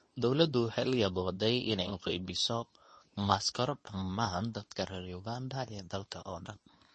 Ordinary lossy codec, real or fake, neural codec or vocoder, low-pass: MP3, 32 kbps; fake; codec, 24 kHz, 0.9 kbps, WavTokenizer, medium speech release version 2; 10.8 kHz